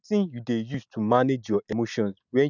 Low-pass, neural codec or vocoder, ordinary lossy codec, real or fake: 7.2 kHz; none; none; real